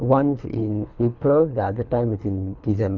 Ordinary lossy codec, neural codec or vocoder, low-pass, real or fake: none; codec, 24 kHz, 3 kbps, HILCodec; 7.2 kHz; fake